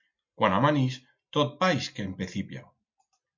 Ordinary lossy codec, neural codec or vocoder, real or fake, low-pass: MP3, 64 kbps; none; real; 7.2 kHz